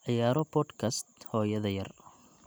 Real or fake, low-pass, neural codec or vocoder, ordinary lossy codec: real; none; none; none